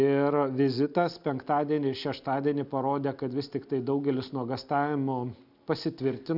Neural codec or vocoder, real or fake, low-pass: none; real; 5.4 kHz